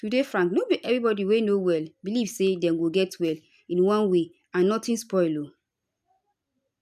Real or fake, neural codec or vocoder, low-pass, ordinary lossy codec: real; none; 14.4 kHz; none